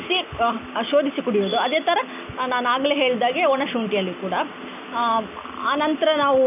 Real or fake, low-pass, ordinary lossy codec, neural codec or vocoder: real; 3.6 kHz; none; none